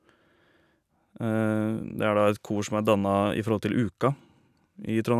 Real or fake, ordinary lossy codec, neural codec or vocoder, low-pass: real; none; none; 14.4 kHz